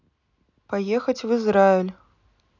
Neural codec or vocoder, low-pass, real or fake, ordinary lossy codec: none; 7.2 kHz; real; none